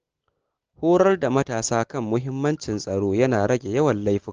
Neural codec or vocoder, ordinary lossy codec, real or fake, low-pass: none; Opus, 16 kbps; real; 7.2 kHz